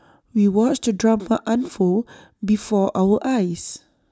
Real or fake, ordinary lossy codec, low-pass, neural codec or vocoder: real; none; none; none